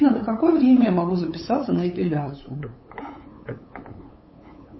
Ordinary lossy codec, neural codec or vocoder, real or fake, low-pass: MP3, 24 kbps; codec, 16 kHz, 8 kbps, FunCodec, trained on LibriTTS, 25 frames a second; fake; 7.2 kHz